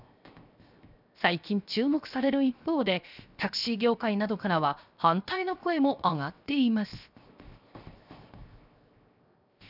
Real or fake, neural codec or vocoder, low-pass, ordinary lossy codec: fake; codec, 16 kHz, 0.7 kbps, FocalCodec; 5.4 kHz; none